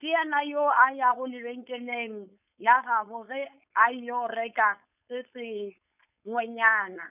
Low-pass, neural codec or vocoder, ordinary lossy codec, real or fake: 3.6 kHz; codec, 16 kHz, 4.8 kbps, FACodec; none; fake